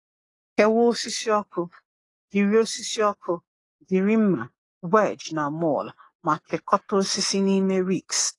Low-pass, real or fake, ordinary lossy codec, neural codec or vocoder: 10.8 kHz; fake; AAC, 32 kbps; codec, 44.1 kHz, 7.8 kbps, DAC